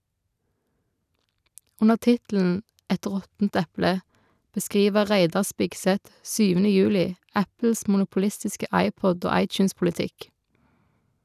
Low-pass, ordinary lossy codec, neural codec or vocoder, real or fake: 14.4 kHz; none; none; real